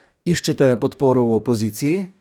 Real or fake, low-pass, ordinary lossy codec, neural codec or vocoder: fake; 19.8 kHz; none; codec, 44.1 kHz, 2.6 kbps, DAC